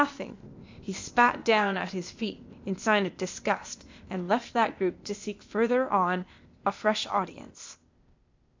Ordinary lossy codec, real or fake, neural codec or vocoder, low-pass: MP3, 64 kbps; fake; codec, 16 kHz, 0.8 kbps, ZipCodec; 7.2 kHz